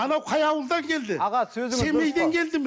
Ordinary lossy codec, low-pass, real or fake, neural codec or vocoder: none; none; real; none